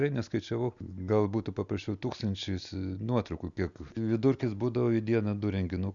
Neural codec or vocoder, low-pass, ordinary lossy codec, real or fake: none; 7.2 kHz; Opus, 64 kbps; real